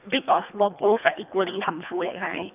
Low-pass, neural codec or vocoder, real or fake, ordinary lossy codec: 3.6 kHz; codec, 24 kHz, 1.5 kbps, HILCodec; fake; none